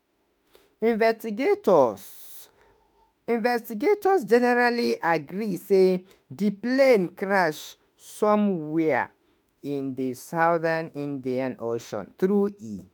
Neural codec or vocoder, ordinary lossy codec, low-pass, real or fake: autoencoder, 48 kHz, 32 numbers a frame, DAC-VAE, trained on Japanese speech; none; none; fake